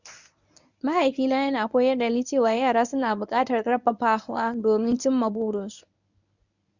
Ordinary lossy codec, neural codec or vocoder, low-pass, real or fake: none; codec, 24 kHz, 0.9 kbps, WavTokenizer, medium speech release version 1; 7.2 kHz; fake